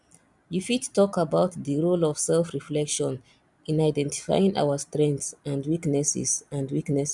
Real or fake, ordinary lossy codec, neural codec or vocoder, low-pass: real; none; none; 10.8 kHz